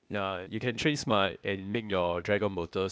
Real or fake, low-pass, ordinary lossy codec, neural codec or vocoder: fake; none; none; codec, 16 kHz, 0.8 kbps, ZipCodec